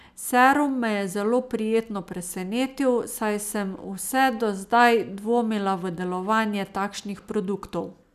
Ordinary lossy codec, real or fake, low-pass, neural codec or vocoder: none; real; 14.4 kHz; none